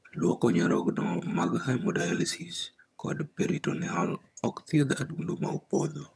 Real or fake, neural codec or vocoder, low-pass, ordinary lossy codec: fake; vocoder, 22.05 kHz, 80 mel bands, HiFi-GAN; none; none